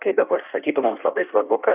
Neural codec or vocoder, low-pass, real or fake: codec, 16 kHz in and 24 kHz out, 1.1 kbps, FireRedTTS-2 codec; 3.6 kHz; fake